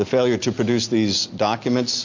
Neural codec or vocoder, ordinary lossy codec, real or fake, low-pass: none; MP3, 48 kbps; real; 7.2 kHz